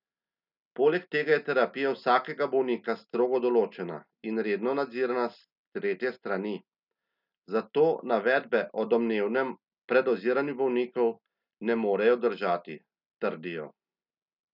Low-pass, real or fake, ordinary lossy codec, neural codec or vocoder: 5.4 kHz; real; none; none